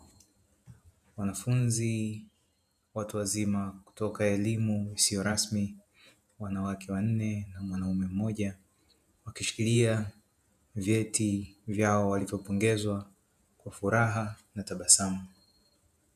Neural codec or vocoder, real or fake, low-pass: vocoder, 48 kHz, 128 mel bands, Vocos; fake; 14.4 kHz